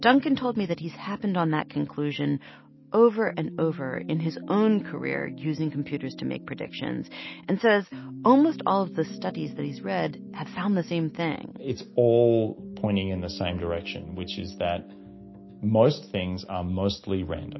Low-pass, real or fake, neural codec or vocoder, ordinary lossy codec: 7.2 kHz; real; none; MP3, 24 kbps